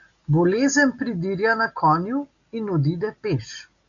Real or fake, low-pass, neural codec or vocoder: real; 7.2 kHz; none